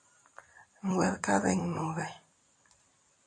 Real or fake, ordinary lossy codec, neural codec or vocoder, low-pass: fake; MP3, 96 kbps; vocoder, 44.1 kHz, 128 mel bands every 256 samples, BigVGAN v2; 9.9 kHz